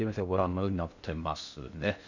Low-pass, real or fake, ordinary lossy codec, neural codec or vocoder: 7.2 kHz; fake; none; codec, 16 kHz in and 24 kHz out, 0.6 kbps, FocalCodec, streaming, 2048 codes